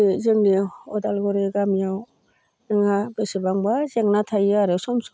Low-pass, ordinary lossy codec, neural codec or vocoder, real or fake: none; none; none; real